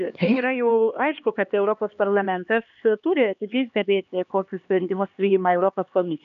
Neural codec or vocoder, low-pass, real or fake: codec, 16 kHz, 2 kbps, X-Codec, HuBERT features, trained on LibriSpeech; 7.2 kHz; fake